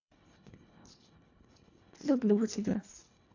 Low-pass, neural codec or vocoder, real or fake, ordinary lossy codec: 7.2 kHz; codec, 24 kHz, 1.5 kbps, HILCodec; fake; AAC, 48 kbps